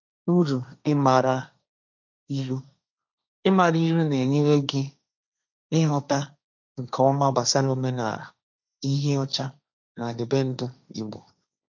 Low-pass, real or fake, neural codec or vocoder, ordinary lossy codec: 7.2 kHz; fake; codec, 16 kHz, 1.1 kbps, Voila-Tokenizer; none